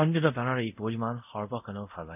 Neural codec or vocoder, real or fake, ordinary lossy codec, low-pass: codec, 24 kHz, 0.5 kbps, DualCodec; fake; none; 3.6 kHz